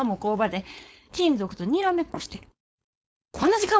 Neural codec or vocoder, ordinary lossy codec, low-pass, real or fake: codec, 16 kHz, 4.8 kbps, FACodec; none; none; fake